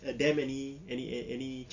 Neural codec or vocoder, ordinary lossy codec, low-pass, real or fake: none; none; 7.2 kHz; real